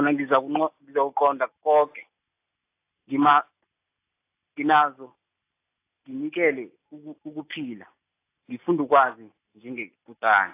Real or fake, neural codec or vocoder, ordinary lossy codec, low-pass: real; none; AAC, 24 kbps; 3.6 kHz